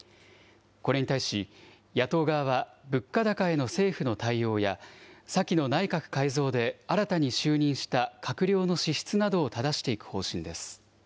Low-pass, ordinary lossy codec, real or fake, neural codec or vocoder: none; none; real; none